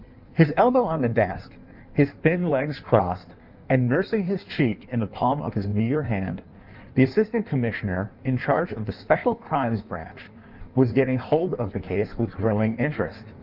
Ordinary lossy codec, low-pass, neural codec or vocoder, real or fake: Opus, 24 kbps; 5.4 kHz; codec, 16 kHz in and 24 kHz out, 1.1 kbps, FireRedTTS-2 codec; fake